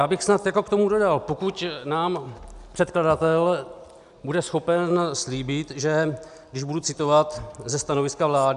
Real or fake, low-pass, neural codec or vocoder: real; 10.8 kHz; none